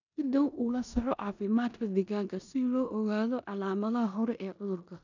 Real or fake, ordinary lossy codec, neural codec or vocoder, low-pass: fake; none; codec, 16 kHz in and 24 kHz out, 0.9 kbps, LongCat-Audio-Codec, four codebook decoder; 7.2 kHz